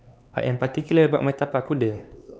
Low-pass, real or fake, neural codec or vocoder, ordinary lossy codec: none; fake; codec, 16 kHz, 4 kbps, X-Codec, HuBERT features, trained on LibriSpeech; none